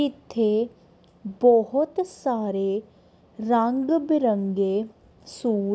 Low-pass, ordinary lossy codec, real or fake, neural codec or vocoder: none; none; real; none